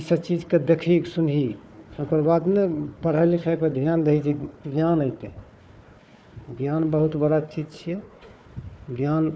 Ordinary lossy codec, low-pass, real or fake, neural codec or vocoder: none; none; fake; codec, 16 kHz, 4 kbps, FunCodec, trained on Chinese and English, 50 frames a second